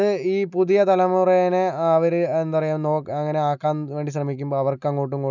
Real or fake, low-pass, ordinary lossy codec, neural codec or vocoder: real; 7.2 kHz; none; none